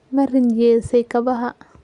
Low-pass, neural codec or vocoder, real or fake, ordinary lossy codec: 10.8 kHz; none; real; Opus, 64 kbps